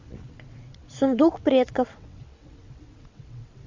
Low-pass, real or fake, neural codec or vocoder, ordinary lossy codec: 7.2 kHz; fake; vocoder, 22.05 kHz, 80 mel bands, WaveNeXt; MP3, 48 kbps